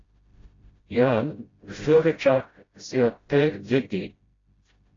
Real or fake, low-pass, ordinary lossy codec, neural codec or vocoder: fake; 7.2 kHz; AAC, 32 kbps; codec, 16 kHz, 0.5 kbps, FreqCodec, smaller model